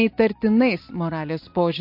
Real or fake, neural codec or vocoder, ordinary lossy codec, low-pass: real; none; MP3, 32 kbps; 5.4 kHz